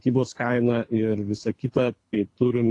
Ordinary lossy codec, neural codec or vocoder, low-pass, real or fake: AAC, 48 kbps; codec, 24 kHz, 3 kbps, HILCodec; 10.8 kHz; fake